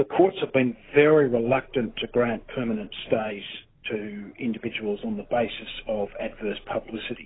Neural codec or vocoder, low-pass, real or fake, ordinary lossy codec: none; 7.2 kHz; real; AAC, 16 kbps